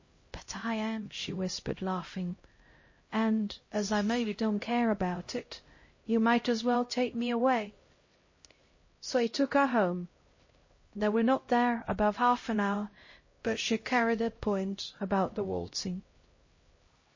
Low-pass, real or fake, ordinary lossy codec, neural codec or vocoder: 7.2 kHz; fake; MP3, 32 kbps; codec, 16 kHz, 0.5 kbps, X-Codec, HuBERT features, trained on LibriSpeech